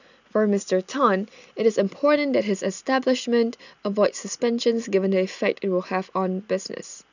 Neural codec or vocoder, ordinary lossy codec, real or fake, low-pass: none; none; real; 7.2 kHz